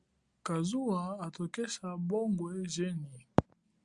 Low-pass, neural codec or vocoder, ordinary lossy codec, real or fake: 10.8 kHz; none; Opus, 64 kbps; real